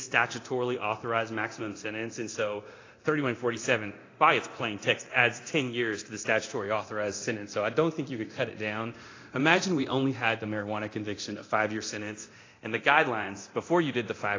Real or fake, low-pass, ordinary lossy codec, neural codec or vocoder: fake; 7.2 kHz; AAC, 32 kbps; codec, 24 kHz, 0.9 kbps, DualCodec